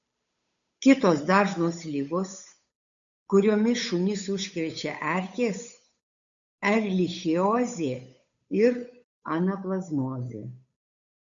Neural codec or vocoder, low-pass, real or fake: codec, 16 kHz, 8 kbps, FunCodec, trained on Chinese and English, 25 frames a second; 7.2 kHz; fake